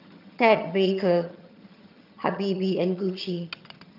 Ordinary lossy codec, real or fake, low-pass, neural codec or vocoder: none; fake; 5.4 kHz; vocoder, 22.05 kHz, 80 mel bands, HiFi-GAN